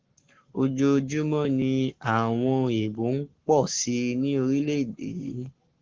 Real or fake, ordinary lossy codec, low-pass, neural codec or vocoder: fake; Opus, 16 kbps; 7.2 kHz; codec, 44.1 kHz, 7.8 kbps, Pupu-Codec